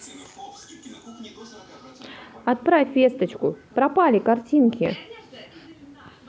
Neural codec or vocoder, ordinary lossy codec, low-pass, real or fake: none; none; none; real